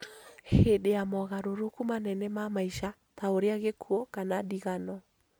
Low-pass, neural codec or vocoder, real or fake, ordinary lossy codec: none; none; real; none